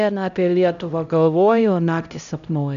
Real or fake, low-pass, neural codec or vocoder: fake; 7.2 kHz; codec, 16 kHz, 0.5 kbps, X-Codec, HuBERT features, trained on LibriSpeech